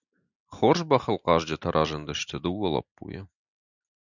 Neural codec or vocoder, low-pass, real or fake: none; 7.2 kHz; real